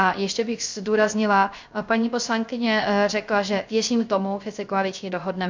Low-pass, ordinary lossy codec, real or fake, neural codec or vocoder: 7.2 kHz; MP3, 64 kbps; fake; codec, 16 kHz, 0.3 kbps, FocalCodec